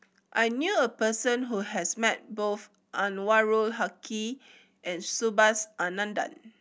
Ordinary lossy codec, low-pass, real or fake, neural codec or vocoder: none; none; real; none